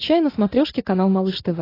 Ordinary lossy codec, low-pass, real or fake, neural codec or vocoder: AAC, 24 kbps; 5.4 kHz; real; none